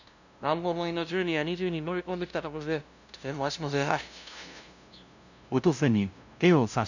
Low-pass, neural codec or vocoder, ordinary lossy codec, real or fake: 7.2 kHz; codec, 16 kHz, 0.5 kbps, FunCodec, trained on LibriTTS, 25 frames a second; none; fake